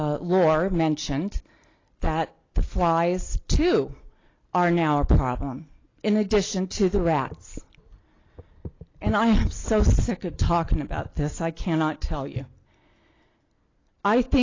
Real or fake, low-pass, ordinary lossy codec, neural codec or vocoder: real; 7.2 kHz; AAC, 32 kbps; none